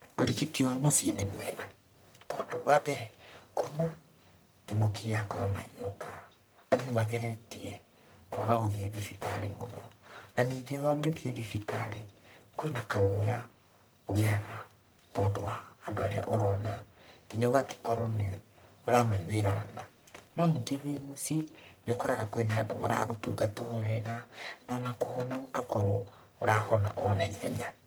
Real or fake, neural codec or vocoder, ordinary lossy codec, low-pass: fake; codec, 44.1 kHz, 1.7 kbps, Pupu-Codec; none; none